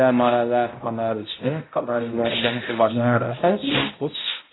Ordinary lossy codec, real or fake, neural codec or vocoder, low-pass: AAC, 16 kbps; fake; codec, 16 kHz, 0.5 kbps, X-Codec, HuBERT features, trained on balanced general audio; 7.2 kHz